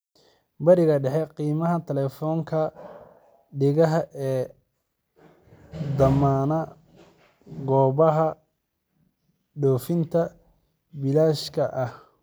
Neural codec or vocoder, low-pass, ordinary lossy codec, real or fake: none; none; none; real